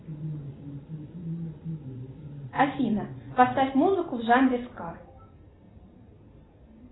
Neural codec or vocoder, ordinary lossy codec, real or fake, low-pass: none; AAC, 16 kbps; real; 7.2 kHz